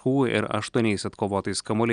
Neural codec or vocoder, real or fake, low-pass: none; real; 9.9 kHz